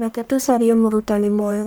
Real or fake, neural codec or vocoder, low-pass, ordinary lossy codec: fake; codec, 44.1 kHz, 1.7 kbps, Pupu-Codec; none; none